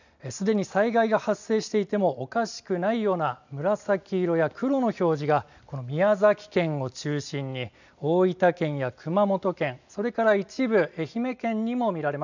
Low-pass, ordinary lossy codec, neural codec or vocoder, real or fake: 7.2 kHz; none; none; real